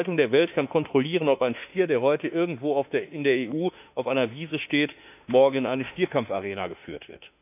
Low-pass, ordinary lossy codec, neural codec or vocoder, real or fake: 3.6 kHz; none; autoencoder, 48 kHz, 32 numbers a frame, DAC-VAE, trained on Japanese speech; fake